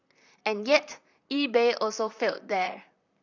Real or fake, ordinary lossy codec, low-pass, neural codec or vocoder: fake; none; 7.2 kHz; vocoder, 44.1 kHz, 128 mel bands, Pupu-Vocoder